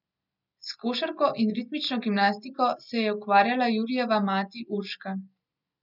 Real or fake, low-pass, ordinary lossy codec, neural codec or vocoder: real; 5.4 kHz; none; none